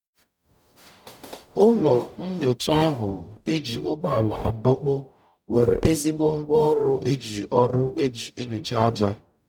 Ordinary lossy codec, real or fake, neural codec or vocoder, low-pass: none; fake; codec, 44.1 kHz, 0.9 kbps, DAC; 19.8 kHz